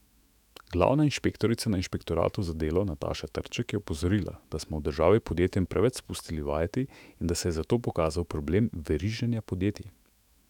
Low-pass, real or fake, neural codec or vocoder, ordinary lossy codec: 19.8 kHz; fake; autoencoder, 48 kHz, 128 numbers a frame, DAC-VAE, trained on Japanese speech; none